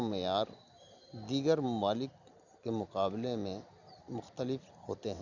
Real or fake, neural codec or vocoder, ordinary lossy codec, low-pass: real; none; none; 7.2 kHz